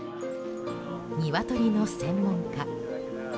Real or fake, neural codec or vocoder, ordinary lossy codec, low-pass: real; none; none; none